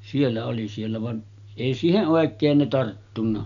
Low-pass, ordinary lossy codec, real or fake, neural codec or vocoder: 7.2 kHz; none; real; none